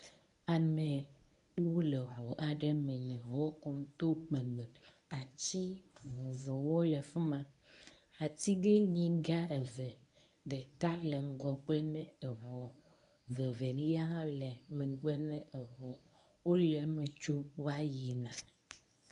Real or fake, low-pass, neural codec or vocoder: fake; 10.8 kHz; codec, 24 kHz, 0.9 kbps, WavTokenizer, medium speech release version 1